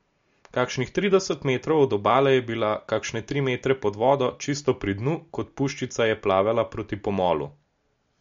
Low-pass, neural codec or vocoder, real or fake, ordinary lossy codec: 7.2 kHz; none; real; MP3, 48 kbps